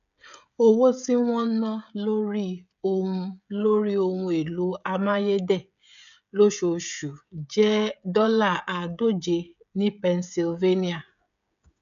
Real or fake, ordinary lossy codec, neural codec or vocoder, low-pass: fake; none; codec, 16 kHz, 16 kbps, FreqCodec, smaller model; 7.2 kHz